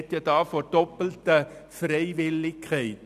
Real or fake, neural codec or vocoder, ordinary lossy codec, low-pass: real; none; none; 14.4 kHz